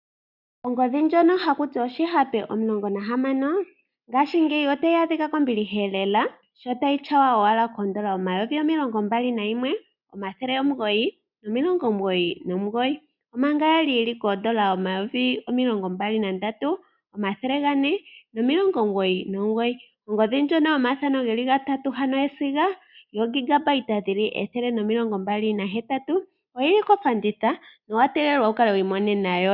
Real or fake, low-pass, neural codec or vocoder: real; 5.4 kHz; none